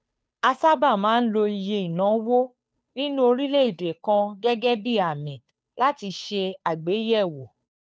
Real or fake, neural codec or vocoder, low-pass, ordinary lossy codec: fake; codec, 16 kHz, 2 kbps, FunCodec, trained on Chinese and English, 25 frames a second; none; none